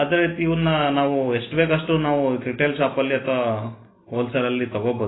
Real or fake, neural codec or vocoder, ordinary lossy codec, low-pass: real; none; AAC, 16 kbps; 7.2 kHz